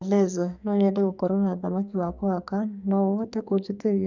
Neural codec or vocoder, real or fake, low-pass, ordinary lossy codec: codec, 44.1 kHz, 2.6 kbps, SNAC; fake; 7.2 kHz; none